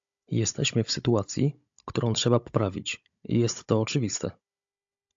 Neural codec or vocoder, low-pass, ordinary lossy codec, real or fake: codec, 16 kHz, 16 kbps, FunCodec, trained on Chinese and English, 50 frames a second; 7.2 kHz; AAC, 64 kbps; fake